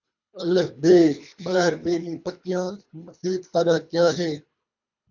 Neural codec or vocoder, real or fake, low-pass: codec, 24 kHz, 3 kbps, HILCodec; fake; 7.2 kHz